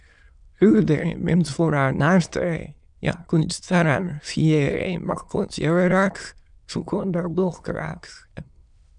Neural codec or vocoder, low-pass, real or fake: autoencoder, 22.05 kHz, a latent of 192 numbers a frame, VITS, trained on many speakers; 9.9 kHz; fake